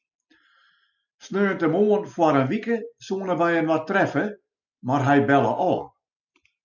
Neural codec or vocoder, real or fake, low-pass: none; real; 7.2 kHz